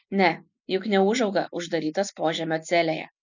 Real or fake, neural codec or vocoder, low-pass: real; none; 7.2 kHz